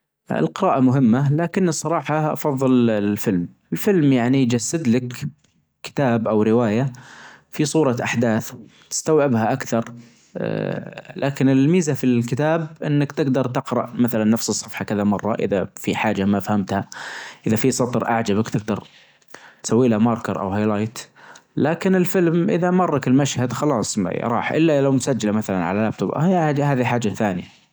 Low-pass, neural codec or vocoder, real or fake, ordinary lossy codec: none; none; real; none